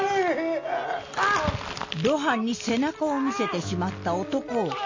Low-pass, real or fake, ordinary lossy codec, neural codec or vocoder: 7.2 kHz; real; MP3, 32 kbps; none